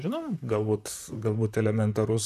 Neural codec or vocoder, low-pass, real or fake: vocoder, 44.1 kHz, 128 mel bands, Pupu-Vocoder; 14.4 kHz; fake